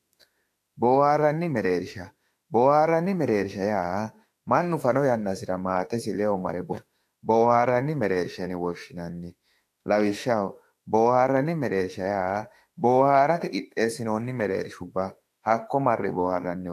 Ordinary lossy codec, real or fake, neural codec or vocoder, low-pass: AAC, 64 kbps; fake; autoencoder, 48 kHz, 32 numbers a frame, DAC-VAE, trained on Japanese speech; 14.4 kHz